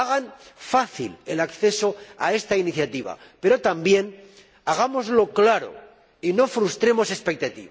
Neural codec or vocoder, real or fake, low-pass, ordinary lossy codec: none; real; none; none